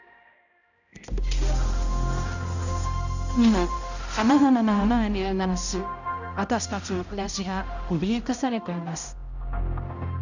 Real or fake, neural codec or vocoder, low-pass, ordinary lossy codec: fake; codec, 16 kHz, 0.5 kbps, X-Codec, HuBERT features, trained on balanced general audio; 7.2 kHz; none